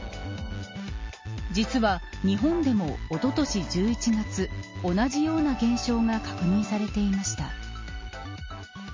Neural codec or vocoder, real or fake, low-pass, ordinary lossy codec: none; real; 7.2 kHz; MP3, 32 kbps